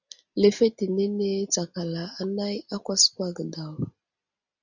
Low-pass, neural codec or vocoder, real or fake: 7.2 kHz; none; real